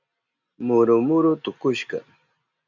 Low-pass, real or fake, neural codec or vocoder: 7.2 kHz; real; none